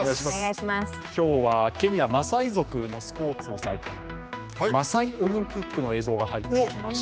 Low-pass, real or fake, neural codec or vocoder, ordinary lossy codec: none; fake; codec, 16 kHz, 2 kbps, X-Codec, HuBERT features, trained on general audio; none